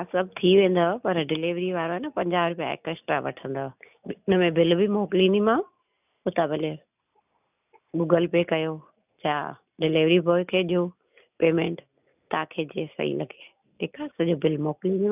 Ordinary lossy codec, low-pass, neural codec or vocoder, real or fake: none; 3.6 kHz; none; real